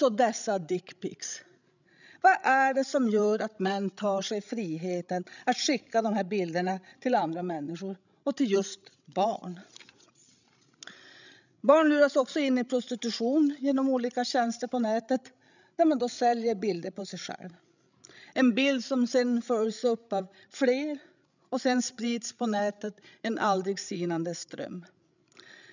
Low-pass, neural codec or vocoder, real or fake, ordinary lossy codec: 7.2 kHz; codec, 16 kHz, 16 kbps, FreqCodec, larger model; fake; none